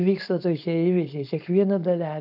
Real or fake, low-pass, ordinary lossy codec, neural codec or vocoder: fake; 5.4 kHz; AAC, 48 kbps; codec, 16 kHz, 4.8 kbps, FACodec